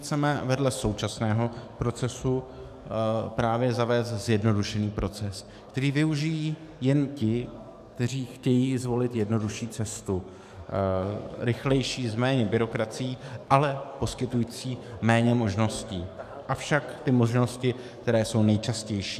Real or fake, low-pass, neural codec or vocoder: fake; 14.4 kHz; codec, 44.1 kHz, 7.8 kbps, DAC